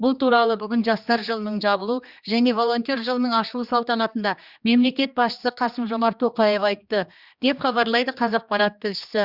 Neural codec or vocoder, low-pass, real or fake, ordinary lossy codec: codec, 16 kHz, 2 kbps, X-Codec, HuBERT features, trained on general audio; 5.4 kHz; fake; Opus, 64 kbps